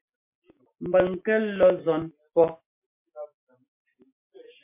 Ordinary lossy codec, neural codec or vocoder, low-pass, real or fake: MP3, 24 kbps; none; 3.6 kHz; real